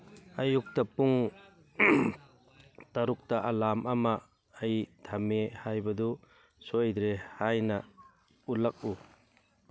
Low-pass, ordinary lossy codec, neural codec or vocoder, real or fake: none; none; none; real